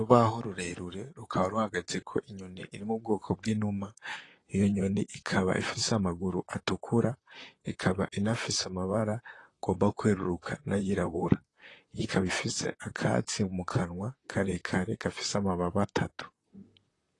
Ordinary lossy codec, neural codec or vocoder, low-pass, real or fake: AAC, 32 kbps; vocoder, 44.1 kHz, 128 mel bands, Pupu-Vocoder; 10.8 kHz; fake